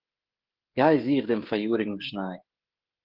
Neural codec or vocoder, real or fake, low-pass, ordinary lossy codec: codec, 16 kHz, 16 kbps, FreqCodec, smaller model; fake; 5.4 kHz; Opus, 16 kbps